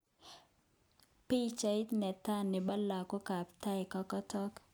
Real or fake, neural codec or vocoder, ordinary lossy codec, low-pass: real; none; none; none